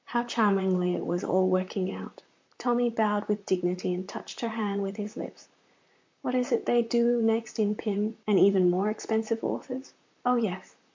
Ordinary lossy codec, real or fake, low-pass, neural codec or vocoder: MP3, 48 kbps; fake; 7.2 kHz; vocoder, 44.1 kHz, 128 mel bands, Pupu-Vocoder